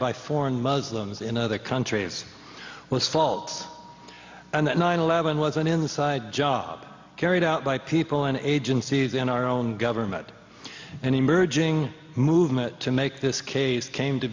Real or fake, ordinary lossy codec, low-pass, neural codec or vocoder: real; MP3, 48 kbps; 7.2 kHz; none